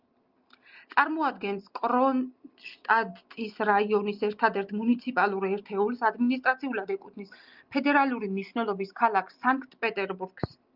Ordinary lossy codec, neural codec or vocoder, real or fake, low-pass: Opus, 32 kbps; none; real; 5.4 kHz